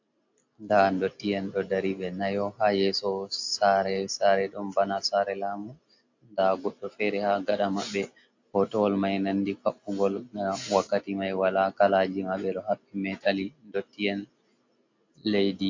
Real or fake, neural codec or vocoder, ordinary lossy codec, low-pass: real; none; AAC, 48 kbps; 7.2 kHz